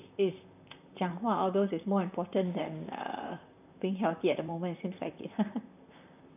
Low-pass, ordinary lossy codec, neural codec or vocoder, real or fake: 3.6 kHz; none; vocoder, 44.1 kHz, 128 mel bands, Pupu-Vocoder; fake